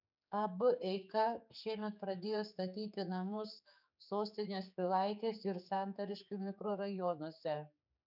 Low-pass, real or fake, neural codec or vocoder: 5.4 kHz; fake; codec, 16 kHz, 4 kbps, X-Codec, HuBERT features, trained on general audio